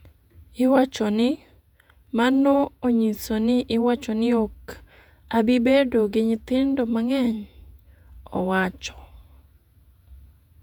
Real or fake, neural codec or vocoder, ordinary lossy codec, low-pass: fake; vocoder, 48 kHz, 128 mel bands, Vocos; none; 19.8 kHz